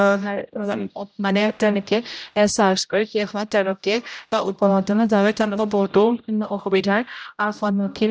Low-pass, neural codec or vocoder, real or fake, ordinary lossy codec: none; codec, 16 kHz, 0.5 kbps, X-Codec, HuBERT features, trained on general audio; fake; none